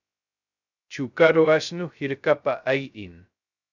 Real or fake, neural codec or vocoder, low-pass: fake; codec, 16 kHz, 0.2 kbps, FocalCodec; 7.2 kHz